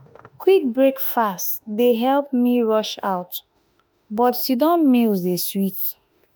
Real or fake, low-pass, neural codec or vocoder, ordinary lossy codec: fake; none; autoencoder, 48 kHz, 32 numbers a frame, DAC-VAE, trained on Japanese speech; none